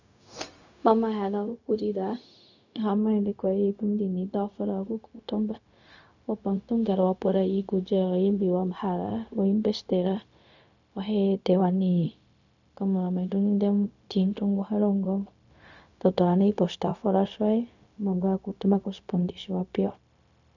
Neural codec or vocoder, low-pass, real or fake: codec, 16 kHz, 0.4 kbps, LongCat-Audio-Codec; 7.2 kHz; fake